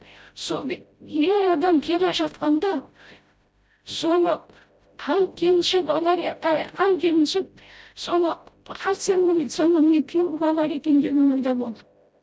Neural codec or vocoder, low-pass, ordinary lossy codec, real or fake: codec, 16 kHz, 0.5 kbps, FreqCodec, smaller model; none; none; fake